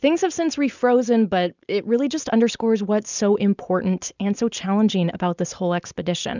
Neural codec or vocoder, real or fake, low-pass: none; real; 7.2 kHz